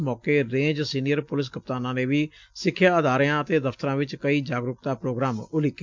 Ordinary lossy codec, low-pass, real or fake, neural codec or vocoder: MP3, 64 kbps; 7.2 kHz; real; none